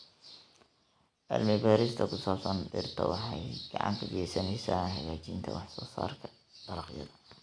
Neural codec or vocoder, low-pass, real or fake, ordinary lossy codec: none; 9.9 kHz; real; none